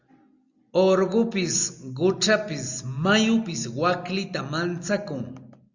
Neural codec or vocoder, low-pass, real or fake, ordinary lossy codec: none; 7.2 kHz; real; Opus, 64 kbps